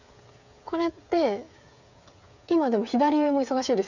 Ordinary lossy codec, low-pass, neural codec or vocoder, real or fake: none; 7.2 kHz; codec, 16 kHz, 8 kbps, FreqCodec, smaller model; fake